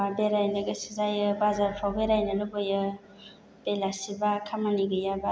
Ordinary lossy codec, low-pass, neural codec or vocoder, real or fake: none; none; none; real